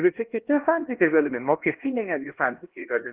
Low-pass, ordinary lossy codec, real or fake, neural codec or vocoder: 3.6 kHz; Opus, 16 kbps; fake; codec, 16 kHz, 1 kbps, X-Codec, HuBERT features, trained on LibriSpeech